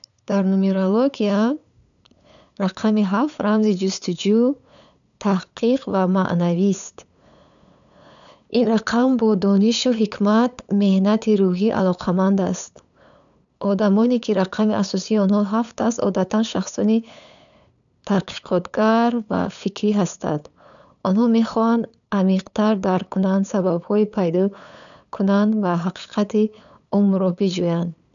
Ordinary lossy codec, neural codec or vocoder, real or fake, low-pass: none; codec, 16 kHz, 8 kbps, FunCodec, trained on LibriTTS, 25 frames a second; fake; 7.2 kHz